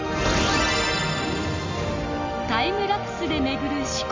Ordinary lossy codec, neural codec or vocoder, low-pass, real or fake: MP3, 48 kbps; none; 7.2 kHz; real